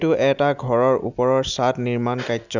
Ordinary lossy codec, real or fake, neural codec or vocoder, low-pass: none; real; none; 7.2 kHz